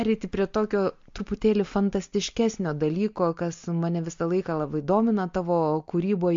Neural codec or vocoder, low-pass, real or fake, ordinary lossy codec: none; 7.2 kHz; real; MP3, 48 kbps